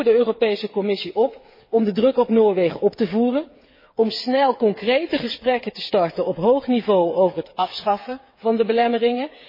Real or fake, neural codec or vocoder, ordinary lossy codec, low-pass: fake; codec, 16 kHz, 8 kbps, FreqCodec, smaller model; MP3, 24 kbps; 5.4 kHz